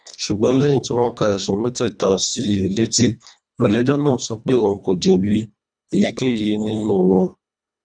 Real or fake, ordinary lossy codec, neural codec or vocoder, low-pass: fake; none; codec, 24 kHz, 1.5 kbps, HILCodec; 9.9 kHz